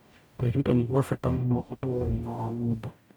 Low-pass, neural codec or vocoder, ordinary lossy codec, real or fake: none; codec, 44.1 kHz, 0.9 kbps, DAC; none; fake